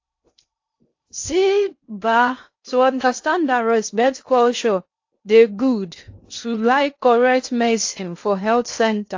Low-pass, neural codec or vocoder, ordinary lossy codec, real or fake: 7.2 kHz; codec, 16 kHz in and 24 kHz out, 0.6 kbps, FocalCodec, streaming, 2048 codes; AAC, 48 kbps; fake